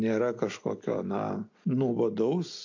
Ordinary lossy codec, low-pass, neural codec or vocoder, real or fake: MP3, 48 kbps; 7.2 kHz; none; real